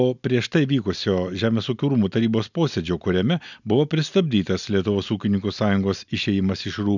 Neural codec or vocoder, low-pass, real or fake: none; 7.2 kHz; real